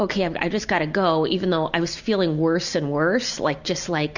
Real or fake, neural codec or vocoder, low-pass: real; none; 7.2 kHz